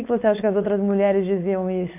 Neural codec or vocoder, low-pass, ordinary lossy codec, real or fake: codec, 16 kHz, 4.8 kbps, FACodec; 3.6 kHz; MP3, 32 kbps; fake